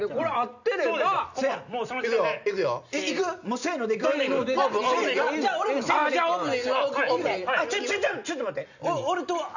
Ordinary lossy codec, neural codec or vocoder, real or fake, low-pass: none; none; real; 7.2 kHz